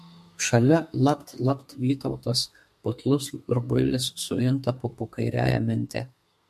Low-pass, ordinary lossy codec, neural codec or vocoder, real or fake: 14.4 kHz; MP3, 64 kbps; codec, 32 kHz, 1.9 kbps, SNAC; fake